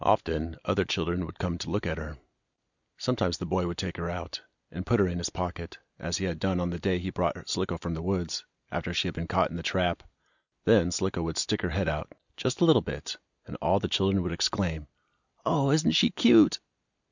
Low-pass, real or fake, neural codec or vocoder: 7.2 kHz; real; none